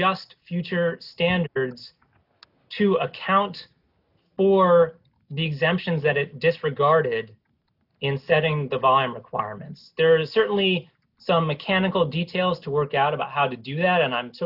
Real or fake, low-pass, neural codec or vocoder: real; 5.4 kHz; none